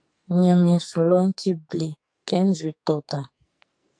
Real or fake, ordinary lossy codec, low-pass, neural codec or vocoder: fake; AAC, 64 kbps; 9.9 kHz; codec, 44.1 kHz, 2.6 kbps, SNAC